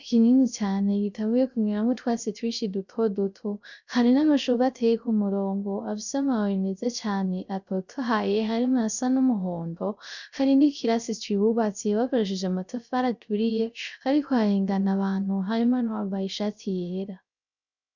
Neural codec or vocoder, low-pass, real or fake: codec, 16 kHz, 0.3 kbps, FocalCodec; 7.2 kHz; fake